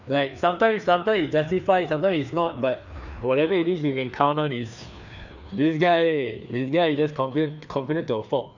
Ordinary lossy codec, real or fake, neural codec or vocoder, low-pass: none; fake; codec, 16 kHz, 2 kbps, FreqCodec, larger model; 7.2 kHz